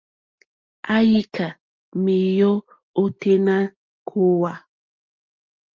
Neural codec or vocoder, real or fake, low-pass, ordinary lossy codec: none; real; 7.2 kHz; Opus, 24 kbps